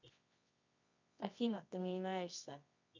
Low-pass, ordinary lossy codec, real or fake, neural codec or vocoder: 7.2 kHz; MP3, 64 kbps; fake; codec, 24 kHz, 0.9 kbps, WavTokenizer, medium music audio release